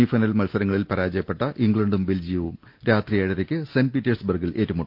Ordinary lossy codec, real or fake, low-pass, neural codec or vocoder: Opus, 32 kbps; real; 5.4 kHz; none